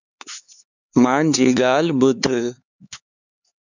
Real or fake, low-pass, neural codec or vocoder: fake; 7.2 kHz; codec, 16 kHz, 4 kbps, X-Codec, HuBERT features, trained on LibriSpeech